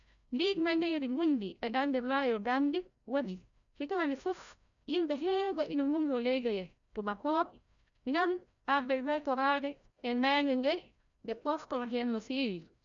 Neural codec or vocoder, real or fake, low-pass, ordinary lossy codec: codec, 16 kHz, 0.5 kbps, FreqCodec, larger model; fake; 7.2 kHz; none